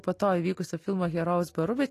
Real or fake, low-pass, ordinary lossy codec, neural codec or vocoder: real; 14.4 kHz; AAC, 48 kbps; none